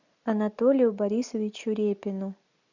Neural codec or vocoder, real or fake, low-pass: none; real; 7.2 kHz